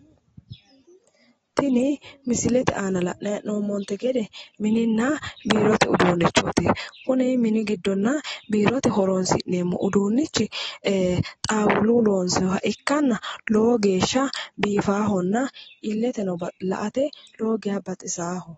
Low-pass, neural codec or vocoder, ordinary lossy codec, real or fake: 19.8 kHz; none; AAC, 24 kbps; real